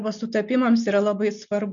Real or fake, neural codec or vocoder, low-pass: real; none; 7.2 kHz